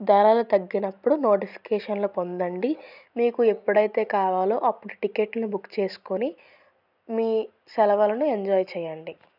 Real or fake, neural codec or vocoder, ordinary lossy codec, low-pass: real; none; none; 5.4 kHz